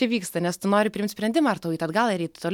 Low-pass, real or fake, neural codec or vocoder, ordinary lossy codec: 19.8 kHz; real; none; MP3, 96 kbps